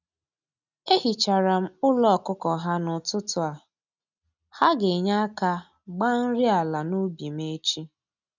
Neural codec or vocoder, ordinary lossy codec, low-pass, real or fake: none; none; 7.2 kHz; real